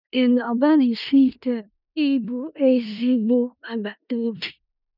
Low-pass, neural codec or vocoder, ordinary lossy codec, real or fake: 5.4 kHz; codec, 16 kHz in and 24 kHz out, 0.4 kbps, LongCat-Audio-Codec, four codebook decoder; none; fake